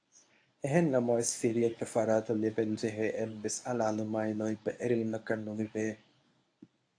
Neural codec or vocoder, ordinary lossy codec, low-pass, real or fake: codec, 24 kHz, 0.9 kbps, WavTokenizer, medium speech release version 1; AAC, 48 kbps; 9.9 kHz; fake